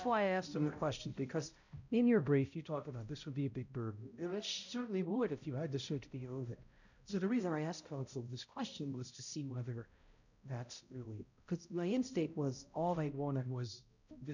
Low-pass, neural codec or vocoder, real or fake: 7.2 kHz; codec, 16 kHz, 0.5 kbps, X-Codec, HuBERT features, trained on balanced general audio; fake